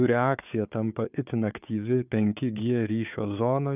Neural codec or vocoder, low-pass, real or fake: codec, 16 kHz, 4 kbps, FunCodec, trained on Chinese and English, 50 frames a second; 3.6 kHz; fake